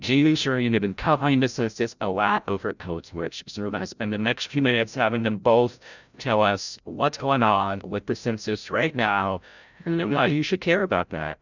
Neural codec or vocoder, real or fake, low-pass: codec, 16 kHz, 0.5 kbps, FreqCodec, larger model; fake; 7.2 kHz